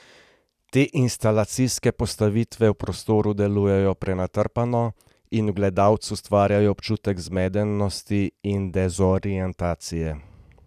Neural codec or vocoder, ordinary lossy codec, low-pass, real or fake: none; none; 14.4 kHz; real